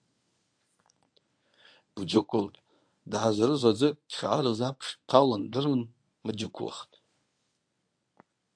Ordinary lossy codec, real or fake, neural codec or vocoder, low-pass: AAC, 64 kbps; fake; codec, 24 kHz, 0.9 kbps, WavTokenizer, medium speech release version 1; 9.9 kHz